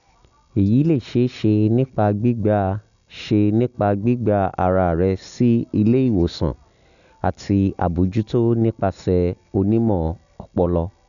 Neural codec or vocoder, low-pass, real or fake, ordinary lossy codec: none; 7.2 kHz; real; none